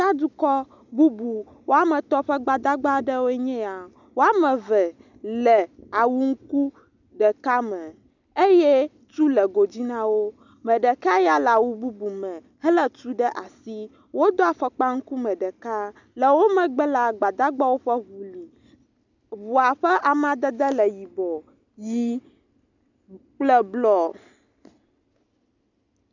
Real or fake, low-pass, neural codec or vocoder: real; 7.2 kHz; none